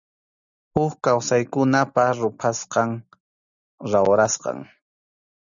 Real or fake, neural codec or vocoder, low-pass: real; none; 7.2 kHz